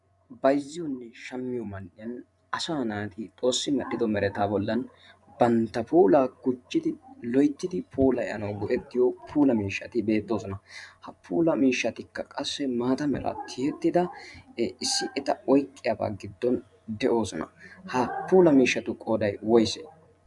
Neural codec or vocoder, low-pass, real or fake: vocoder, 24 kHz, 100 mel bands, Vocos; 10.8 kHz; fake